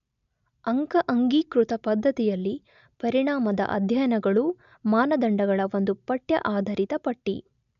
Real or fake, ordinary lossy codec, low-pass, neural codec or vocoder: real; none; 7.2 kHz; none